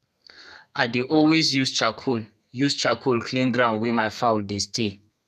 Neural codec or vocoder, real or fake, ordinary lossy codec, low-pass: codec, 32 kHz, 1.9 kbps, SNAC; fake; none; 14.4 kHz